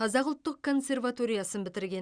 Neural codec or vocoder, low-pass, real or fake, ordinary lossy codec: none; 9.9 kHz; real; Opus, 64 kbps